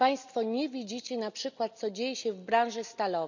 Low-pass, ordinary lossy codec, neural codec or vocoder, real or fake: 7.2 kHz; none; none; real